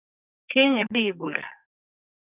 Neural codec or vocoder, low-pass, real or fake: codec, 44.1 kHz, 2.6 kbps, SNAC; 3.6 kHz; fake